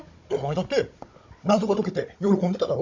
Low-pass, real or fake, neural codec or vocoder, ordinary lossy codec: 7.2 kHz; fake; codec, 16 kHz, 16 kbps, FunCodec, trained on Chinese and English, 50 frames a second; MP3, 48 kbps